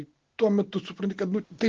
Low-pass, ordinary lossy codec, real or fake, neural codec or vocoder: 7.2 kHz; Opus, 16 kbps; real; none